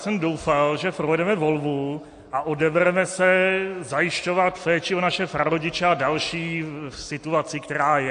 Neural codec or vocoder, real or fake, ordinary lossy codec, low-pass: none; real; AAC, 48 kbps; 9.9 kHz